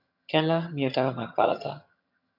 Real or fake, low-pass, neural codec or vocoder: fake; 5.4 kHz; vocoder, 22.05 kHz, 80 mel bands, HiFi-GAN